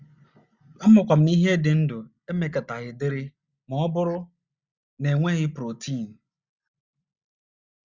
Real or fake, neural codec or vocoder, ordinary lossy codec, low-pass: real; none; Opus, 64 kbps; 7.2 kHz